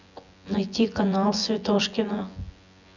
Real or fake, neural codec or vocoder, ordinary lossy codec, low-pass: fake; vocoder, 24 kHz, 100 mel bands, Vocos; none; 7.2 kHz